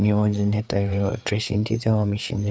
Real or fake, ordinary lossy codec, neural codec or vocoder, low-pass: fake; none; codec, 16 kHz, 4 kbps, FreqCodec, larger model; none